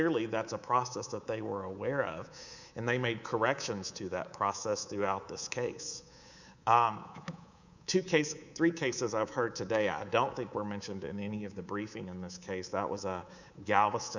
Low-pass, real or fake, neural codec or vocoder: 7.2 kHz; fake; codec, 24 kHz, 3.1 kbps, DualCodec